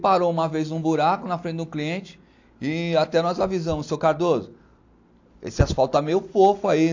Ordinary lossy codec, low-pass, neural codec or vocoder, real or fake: AAC, 48 kbps; 7.2 kHz; none; real